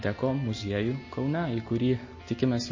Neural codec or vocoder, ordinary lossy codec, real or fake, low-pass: none; MP3, 32 kbps; real; 7.2 kHz